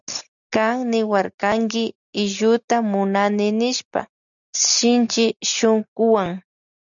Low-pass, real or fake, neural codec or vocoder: 7.2 kHz; real; none